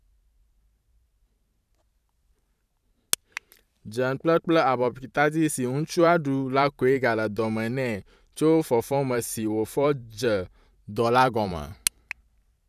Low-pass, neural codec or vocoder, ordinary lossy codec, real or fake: 14.4 kHz; vocoder, 44.1 kHz, 128 mel bands every 512 samples, BigVGAN v2; none; fake